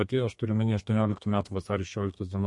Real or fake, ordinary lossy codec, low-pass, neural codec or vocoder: fake; MP3, 48 kbps; 10.8 kHz; codec, 32 kHz, 1.9 kbps, SNAC